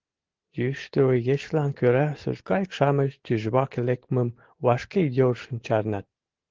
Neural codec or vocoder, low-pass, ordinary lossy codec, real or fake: none; 7.2 kHz; Opus, 16 kbps; real